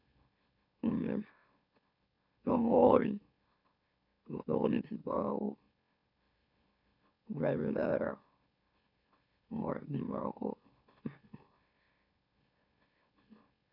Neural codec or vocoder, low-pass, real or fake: autoencoder, 44.1 kHz, a latent of 192 numbers a frame, MeloTTS; 5.4 kHz; fake